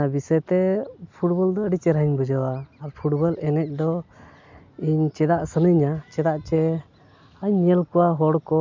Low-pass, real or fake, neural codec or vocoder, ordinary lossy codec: 7.2 kHz; real; none; MP3, 64 kbps